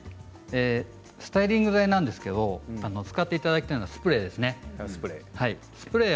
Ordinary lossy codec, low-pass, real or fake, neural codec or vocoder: none; none; real; none